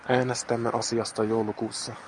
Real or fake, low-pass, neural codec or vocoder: real; 10.8 kHz; none